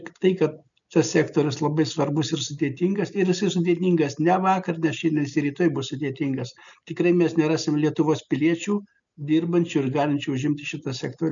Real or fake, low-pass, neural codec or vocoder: real; 7.2 kHz; none